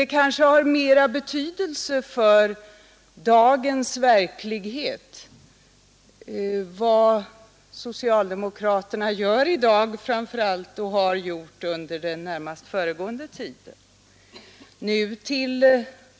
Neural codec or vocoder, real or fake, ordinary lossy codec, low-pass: none; real; none; none